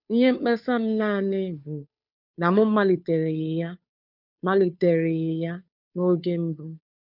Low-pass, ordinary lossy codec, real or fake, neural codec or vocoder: 5.4 kHz; none; fake; codec, 16 kHz, 2 kbps, FunCodec, trained on Chinese and English, 25 frames a second